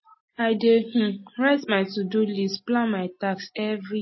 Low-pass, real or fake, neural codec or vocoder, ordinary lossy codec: 7.2 kHz; real; none; MP3, 24 kbps